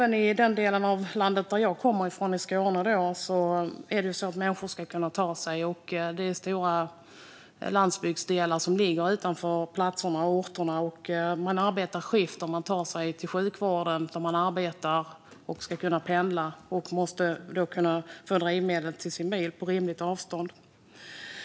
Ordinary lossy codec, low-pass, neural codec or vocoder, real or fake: none; none; none; real